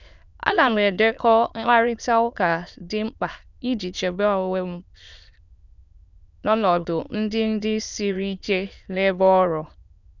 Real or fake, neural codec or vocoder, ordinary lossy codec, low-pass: fake; autoencoder, 22.05 kHz, a latent of 192 numbers a frame, VITS, trained on many speakers; none; 7.2 kHz